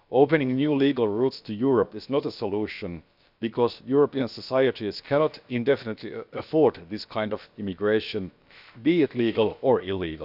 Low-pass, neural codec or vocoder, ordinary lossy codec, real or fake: 5.4 kHz; codec, 16 kHz, about 1 kbps, DyCAST, with the encoder's durations; none; fake